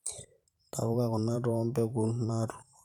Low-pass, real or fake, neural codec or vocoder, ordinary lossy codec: 19.8 kHz; fake; vocoder, 44.1 kHz, 128 mel bands every 512 samples, BigVGAN v2; none